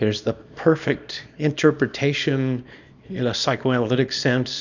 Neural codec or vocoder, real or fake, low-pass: codec, 24 kHz, 0.9 kbps, WavTokenizer, small release; fake; 7.2 kHz